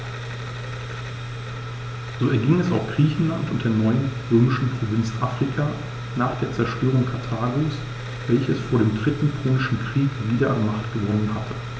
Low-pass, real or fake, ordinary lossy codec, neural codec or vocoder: none; real; none; none